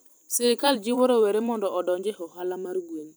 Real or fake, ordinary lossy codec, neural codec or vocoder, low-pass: fake; none; vocoder, 44.1 kHz, 128 mel bands every 512 samples, BigVGAN v2; none